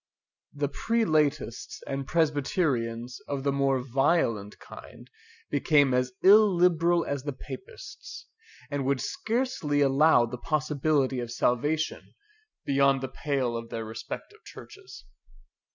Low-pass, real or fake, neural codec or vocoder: 7.2 kHz; real; none